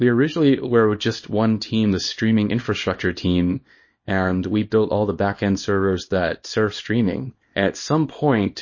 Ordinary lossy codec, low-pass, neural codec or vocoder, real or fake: MP3, 32 kbps; 7.2 kHz; codec, 24 kHz, 0.9 kbps, WavTokenizer, small release; fake